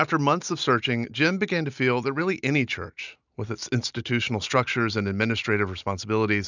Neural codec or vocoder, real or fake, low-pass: none; real; 7.2 kHz